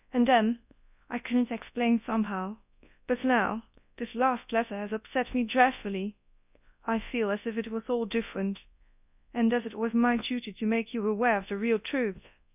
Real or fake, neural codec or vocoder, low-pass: fake; codec, 24 kHz, 0.9 kbps, WavTokenizer, large speech release; 3.6 kHz